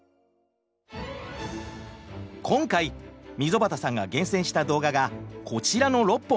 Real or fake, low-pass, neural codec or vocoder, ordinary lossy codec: real; none; none; none